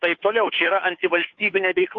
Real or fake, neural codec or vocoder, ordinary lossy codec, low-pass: fake; codec, 16 kHz, 2 kbps, FunCodec, trained on Chinese and English, 25 frames a second; AAC, 48 kbps; 7.2 kHz